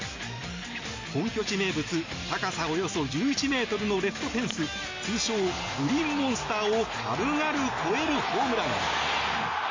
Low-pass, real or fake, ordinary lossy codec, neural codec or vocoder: 7.2 kHz; real; MP3, 48 kbps; none